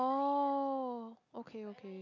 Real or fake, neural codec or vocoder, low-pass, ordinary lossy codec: real; none; 7.2 kHz; none